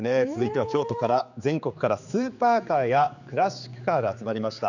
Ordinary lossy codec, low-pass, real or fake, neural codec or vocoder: none; 7.2 kHz; fake; codec, 16 kHz, 4 kbps, X-Codec, HuBERT features, trained on general audio